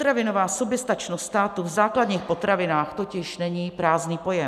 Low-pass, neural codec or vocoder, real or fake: 14.4 kHz; none; real